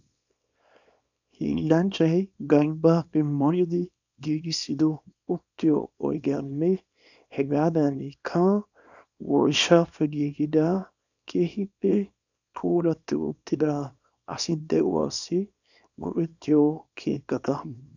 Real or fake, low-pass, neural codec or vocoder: fake; 7.2 kHz; codec, 24 kHz, 0.9 kbps, WavTokenizer, small release